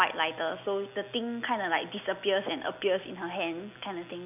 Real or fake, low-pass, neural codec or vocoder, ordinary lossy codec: real; 3.6 kHz; none; none